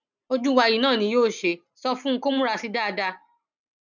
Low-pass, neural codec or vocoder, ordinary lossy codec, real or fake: 7.2 kHz; none; none; real